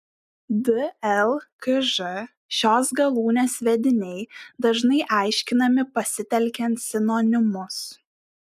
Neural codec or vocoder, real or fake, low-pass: none; real; 14.4 kHz